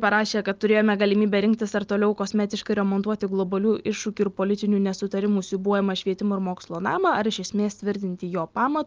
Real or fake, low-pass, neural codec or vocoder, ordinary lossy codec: real; 7.2 kHz; none; Opus, 24 kbps